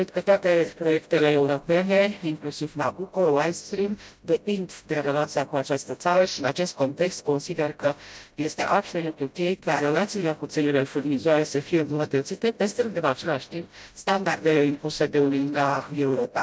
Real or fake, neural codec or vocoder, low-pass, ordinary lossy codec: fake; codec, 16 kHz, 0.5 kbps, FreqCodec, smaller model; none; none